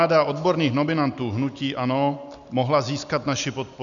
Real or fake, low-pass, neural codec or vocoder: real; 7.2 kHz; none